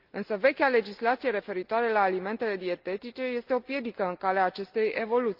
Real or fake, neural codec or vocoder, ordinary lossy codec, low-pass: real; none; Opus, 24 kbps; 5.4 kHz